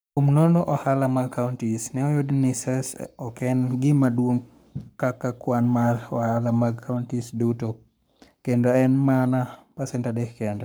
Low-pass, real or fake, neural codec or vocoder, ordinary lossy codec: none; fake; codec, 44.1 kHz, 7.8 kbps, Pupu-Codec; none